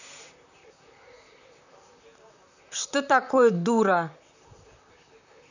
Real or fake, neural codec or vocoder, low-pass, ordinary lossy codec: fake; vocoder, 44.1 kHz, 128 mel bands, Pupu-Vocoder; 7.2 kHz; none